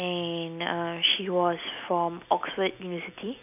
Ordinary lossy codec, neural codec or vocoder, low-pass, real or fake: none; none; 3.6 kHz; real